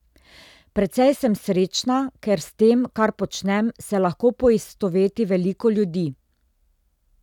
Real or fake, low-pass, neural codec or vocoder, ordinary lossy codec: real; 19.8 kHz; none; none